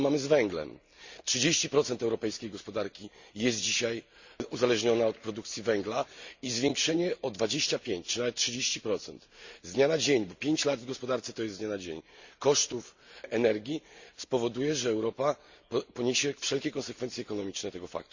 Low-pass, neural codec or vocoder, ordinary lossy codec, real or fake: 7.2 kHz; none; Opus, 64 kbps; real